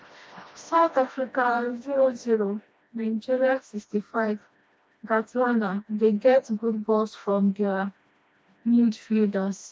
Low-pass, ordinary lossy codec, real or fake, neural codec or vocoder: none; none; fake; codec, 16 kHz, 1 kbps, FreqCodec, smaller model